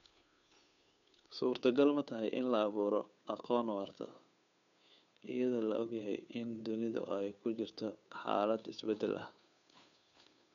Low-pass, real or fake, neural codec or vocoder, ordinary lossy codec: 7.2 kHz; fake; codec, 16 kHz, 2 kbps, FunCodec, trained on Chinese and English, 25 frames a second; none